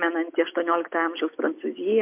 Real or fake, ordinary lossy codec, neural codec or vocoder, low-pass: real; MP3, 32 kbps; none; 3.6 kHz